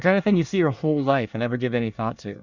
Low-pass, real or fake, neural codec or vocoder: 7.2 kHz; fake; codec, 24 kHz, 1 kbps, SNAC